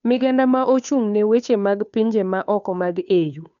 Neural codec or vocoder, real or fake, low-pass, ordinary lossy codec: codec, 16 kHz, 2 kbps, FunCodec, trained on Chinese and English, 25 frames a second; fake; 7.2 kHz; none